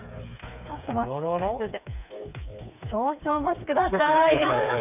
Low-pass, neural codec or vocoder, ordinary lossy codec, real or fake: 3.6 kHz; codec, 16 kHz, 4 kbps, FreqCodec, smaller model; none; fake